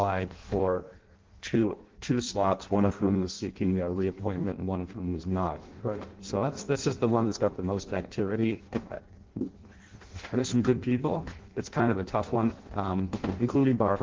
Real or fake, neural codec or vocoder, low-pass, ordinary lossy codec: fake; codec, 16 kHz in and 24 kHz out, 0.6 kbps, FireRedTTS-2 codec; 7.2 kHz; Opus, 16 kbps